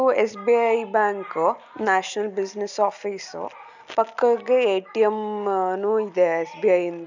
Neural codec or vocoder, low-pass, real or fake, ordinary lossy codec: none; 7.2 kHz; real; none